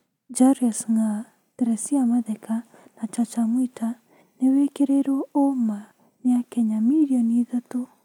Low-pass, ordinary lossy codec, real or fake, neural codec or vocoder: 19.8 kHz; none; real; none